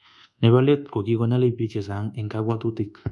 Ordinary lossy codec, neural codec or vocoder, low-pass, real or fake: none; codec, 24 kHz, 1.2 kbps, DualCodec; none; fake